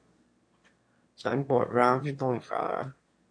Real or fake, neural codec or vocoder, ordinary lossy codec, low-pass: fake; autoencoder, 22.05 kHz, a latent of 192 numbers a frame, VITS, trained on one speaker; MP3, 48 kbps; 9.9 kHz